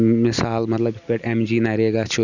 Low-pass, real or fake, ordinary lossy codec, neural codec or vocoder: 7.2 kHz; real; none; none